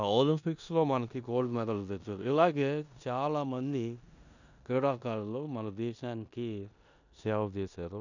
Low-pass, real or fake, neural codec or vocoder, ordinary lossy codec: 7.2 kHz; fake; codec, 16 kHz in and 24 kHz out, 0.9 kbps, LongCat-Audio-Codec, four codebook decoder; none